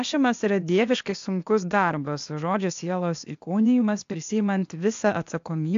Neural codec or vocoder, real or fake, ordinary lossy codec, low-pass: codec, 16 kHz, 0.8 kbps, ZipCodec; fake; AAC, 64 kbps; 7.2 kHz